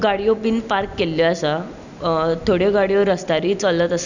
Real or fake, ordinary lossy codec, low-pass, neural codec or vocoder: real; none; 7.2 kHz; none